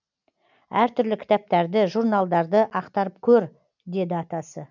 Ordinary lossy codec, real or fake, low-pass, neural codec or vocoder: none; real; 7.2 kHz; none